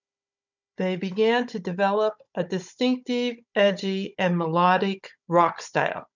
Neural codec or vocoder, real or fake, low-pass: codec, 16 kHz, 16 kbps, FunCodec, trained on Chinese and English, 50 frames a second; fake; 7.2 kHz